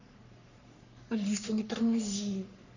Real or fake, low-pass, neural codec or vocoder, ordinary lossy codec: fake; 7.2 kHz; codec, 44.1 kHz, 3.4 kbps, Pupu-Codec; none